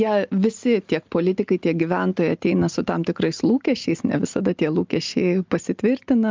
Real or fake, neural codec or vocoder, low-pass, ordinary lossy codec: real; none; 7.2 kHz; Opus, 24 kbps